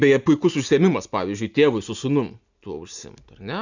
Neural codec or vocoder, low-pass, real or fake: none; 7.2 kHz; real